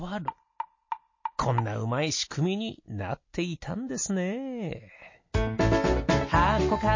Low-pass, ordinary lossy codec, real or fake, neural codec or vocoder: 7.2 kHz; MP3, 32 kbps; real; none